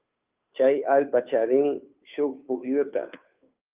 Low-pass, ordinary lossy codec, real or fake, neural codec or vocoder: 3.6 kHz; Opus, 24 kbps; fake; codec, 16 kHz, 2 kbps, FunCodec, trained on Chinese and English, 25 frames a second